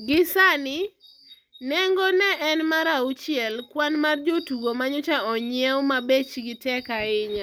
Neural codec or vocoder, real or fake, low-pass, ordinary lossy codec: none; real; none; none